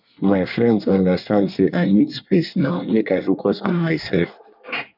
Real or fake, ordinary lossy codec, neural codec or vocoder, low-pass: fake; none; codec, 24 kHz, 1 kbps, SNAC; 5.4 kHz